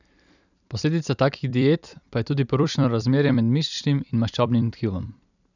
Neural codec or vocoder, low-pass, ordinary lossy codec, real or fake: vocoder, 44.1 kHz, 128 mel bands every 256 samples, BigVGAN v2; 7.2 kHz; none; fake